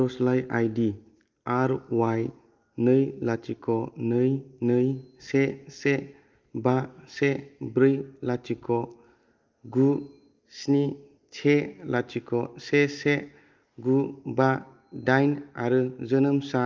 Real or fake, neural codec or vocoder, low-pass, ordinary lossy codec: real; none; 7.2 kHz; Opus, 24 kbps